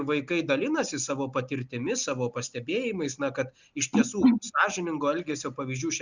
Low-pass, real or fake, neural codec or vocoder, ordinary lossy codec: 7.2 kHz; real; none; Opus, 64 kbps